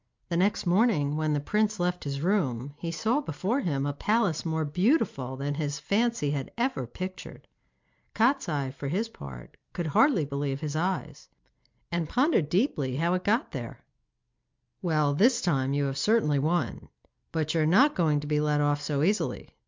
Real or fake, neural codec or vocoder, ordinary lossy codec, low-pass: real; none; MP3, 64 kbps; 7.2 kHz